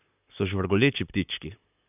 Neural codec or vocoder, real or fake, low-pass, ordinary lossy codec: vocoder, 44.1 kHz, 128 mel bands, Pupu-Vocoder; fake; 3.6 kHz; none